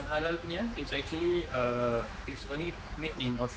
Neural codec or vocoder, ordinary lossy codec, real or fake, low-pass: codec, 16 kHz, 2 kbps, X-Codec, HuBERT features, trained on general audio; none; fake; none